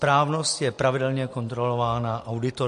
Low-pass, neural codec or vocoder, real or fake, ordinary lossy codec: 14.4 kHz; none; real; MP3, 48 kbps